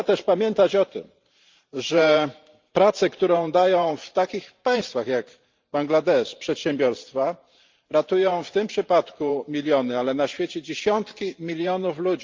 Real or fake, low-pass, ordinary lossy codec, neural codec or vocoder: fake; 7.2 kHz; Opus, 24 kbps; vocoder, 44.1 kHz, 128 mel bands every 512 samples, BigVGAN v2